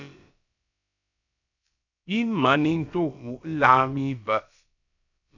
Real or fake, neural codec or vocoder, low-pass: fake; codec, 16 kHz, about 1 kbps, DyCAST, with the encoder's durations; 7.2 kHz